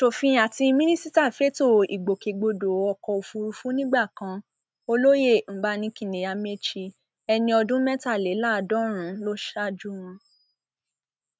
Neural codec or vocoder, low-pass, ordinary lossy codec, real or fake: none; none; none; real